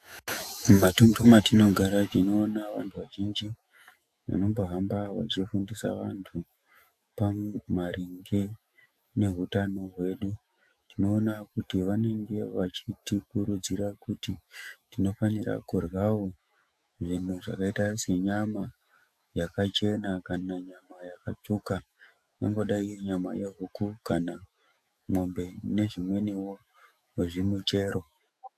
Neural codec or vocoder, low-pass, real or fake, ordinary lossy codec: autoencoder, 48 kHz, 128 numbers a frame, DAC-VAE, trained on Japanese speech; 14.4 kHz; fake; AAC, 96 kbps